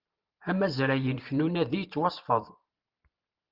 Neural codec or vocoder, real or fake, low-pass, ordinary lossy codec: vocoder, 44.1 kHz, 128 mel bands every 512 samples, BigVGAN v2; fake; 5.4 kHz; Opus, 32 kbps